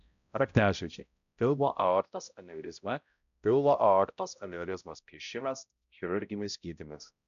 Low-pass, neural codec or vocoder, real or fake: 7.2 kHz; codec, 16 kHz, 0.5 kbps, X-Codec, HuBERT features, trained on balanced general audio; fake